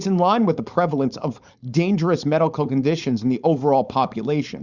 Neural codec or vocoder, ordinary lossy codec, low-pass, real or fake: codec, 16 kHz, 4.8 kbps, FACodec; Opus, 64 kbps; 7.2 kHz; fake